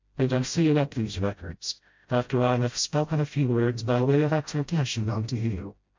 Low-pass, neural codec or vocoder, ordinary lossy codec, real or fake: 7.2 kHz; codec, 16 kHz, 0.5 kbps, FreqCodec, smaller model; MP3, 48 kbps; fake